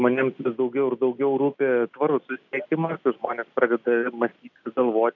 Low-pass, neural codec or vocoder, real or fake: 7.2 kHz; none; real